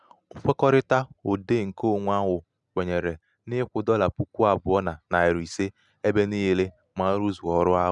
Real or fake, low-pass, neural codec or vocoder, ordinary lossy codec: real; 10.8 kHz; none; none